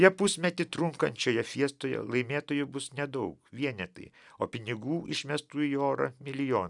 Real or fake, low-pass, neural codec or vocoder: real; 10.8 kHz; none